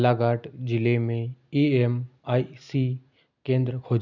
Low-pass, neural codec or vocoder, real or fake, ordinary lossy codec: 7.2 kHz; none; real; none